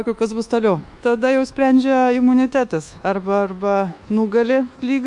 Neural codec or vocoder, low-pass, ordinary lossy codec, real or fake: codec, 24 kHz, 1.2 kbps, DualCodec; 10.8 kHz; MP3, 64 kbps; fake